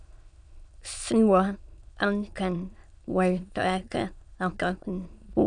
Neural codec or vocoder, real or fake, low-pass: autoencoder, 22.05 kHz, a latent of 192 numbers a frame, VITS, trained on many speakers; fake; 9.9 kHz